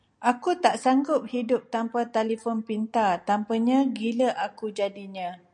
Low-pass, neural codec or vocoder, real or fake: 9.9 kHz; none; real